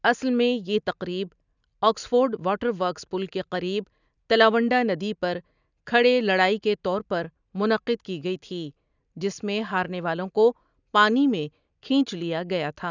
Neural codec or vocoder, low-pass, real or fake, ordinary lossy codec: none; 7.2 kHz; real; none